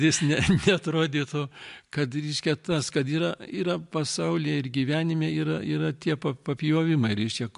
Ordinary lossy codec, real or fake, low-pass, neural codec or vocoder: MP3, 64 kbps; real; 10.8 kHz; none